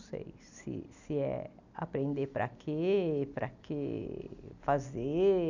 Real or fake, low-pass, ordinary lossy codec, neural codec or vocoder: real; 7.2 kHz; none; none